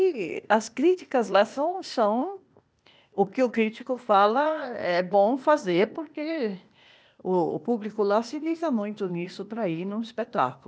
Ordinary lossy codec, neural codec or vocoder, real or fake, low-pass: none; codec, 16 kHz, 0.8 kbps, ZipCodec; fake; none